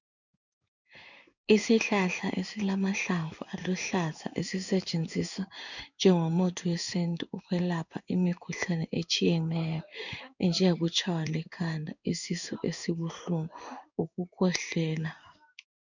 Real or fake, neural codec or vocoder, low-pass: fake; codec, 16 kHz in and 24 kHz out, 1 kbps, XY-Tokenizer; 7.2 kHz